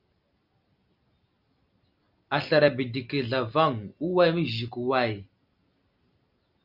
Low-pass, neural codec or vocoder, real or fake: 5.4 kHz; none; real